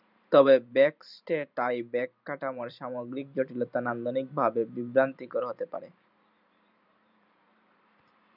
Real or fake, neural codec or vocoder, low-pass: fake; vocoder, 44.1 kHz, 128 mel bands every 512 samples, BigVGAN v2; 5.4 kHz